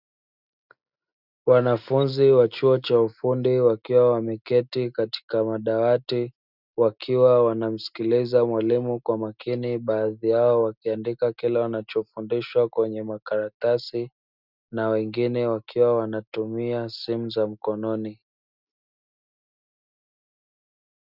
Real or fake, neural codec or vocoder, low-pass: real; none; 5.4 kHz